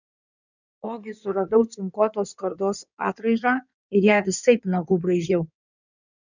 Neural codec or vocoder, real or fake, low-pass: codec, 16 kHz in and 24 kHz out, 2.2 kbps, FireRedTTS-2 codec; fake; 7.2 kHz